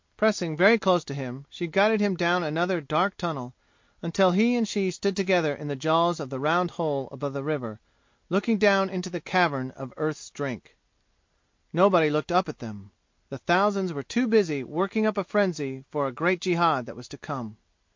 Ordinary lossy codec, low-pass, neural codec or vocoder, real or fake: MP3, 48 kbps; 7.2 kHz; none; real